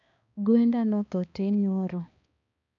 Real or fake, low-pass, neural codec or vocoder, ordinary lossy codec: fake; 7.2 kHz; codec, 16 kHz, 2 kbps, X-Codec, HuBERT features, trained on balanced general audio; none